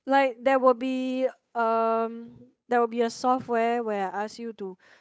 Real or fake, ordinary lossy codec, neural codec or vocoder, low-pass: fake; none; codec, 16 kHz, 8 kbps, FunCodec, trained on Chinese and English, 25 frames a second; none